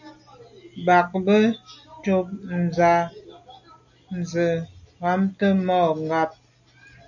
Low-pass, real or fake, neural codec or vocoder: 7.2 kHz; real; none